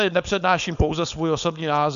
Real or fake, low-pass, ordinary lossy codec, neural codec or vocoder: fake; 7.2 kHz; AAC, 96 kbps; codec, 16 kHz, 4.8 kbps, FACodec